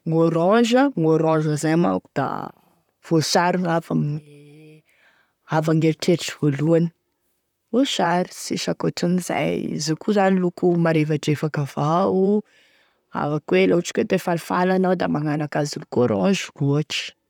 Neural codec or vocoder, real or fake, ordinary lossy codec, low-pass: vocoder, 44.1 kHz, 128 mel bands, Pupu-Vocoder; fake; none; 19.8 kHz